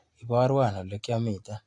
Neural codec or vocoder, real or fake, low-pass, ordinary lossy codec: none; real; 10.8 kHz; AAC, 48 kbps